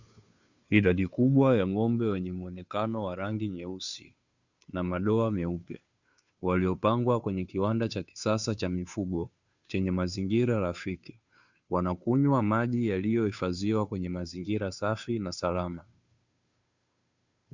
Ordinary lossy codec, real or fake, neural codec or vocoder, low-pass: Opus, 64 kbps; fake; codec, 16 kHz, 2 kbps, FunCodec, trained on Chinese and English, 25 frames a second; 7.2 kHz